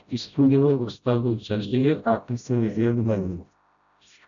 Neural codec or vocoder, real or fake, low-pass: codec, 16 kHz, 0.5 kbps, FreqCodec, smaller model; fake; 7.2 kHz